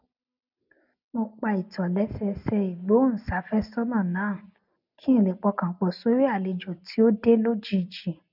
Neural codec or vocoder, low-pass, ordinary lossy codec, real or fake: none; 5.4 kHz; none; real